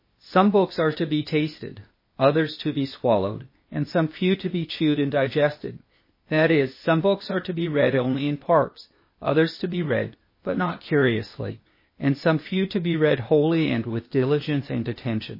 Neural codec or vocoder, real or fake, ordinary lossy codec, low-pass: codec, 16 kHz, 0.8 kbps, ZipCodec; fake; MP3, 24 kbps; 5.4 kHz